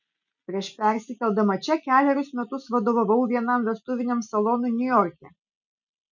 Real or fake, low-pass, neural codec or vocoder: real; 7.2 kHz; none